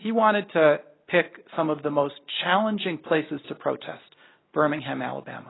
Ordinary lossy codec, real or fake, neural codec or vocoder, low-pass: AAC, 16 kbps; real; none; 7.2 kHz